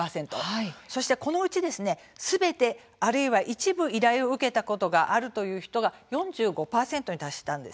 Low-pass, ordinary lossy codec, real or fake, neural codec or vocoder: none; none; real; none